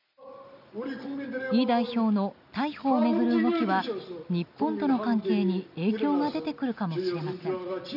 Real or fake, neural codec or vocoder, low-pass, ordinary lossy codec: real; none; 5.4 kHz; none